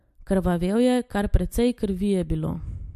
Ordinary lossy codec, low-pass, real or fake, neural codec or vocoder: MP3, 64 kbps; 14.4 kHz; real; none